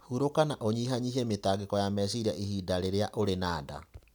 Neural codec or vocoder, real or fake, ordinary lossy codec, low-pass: none; real; none; none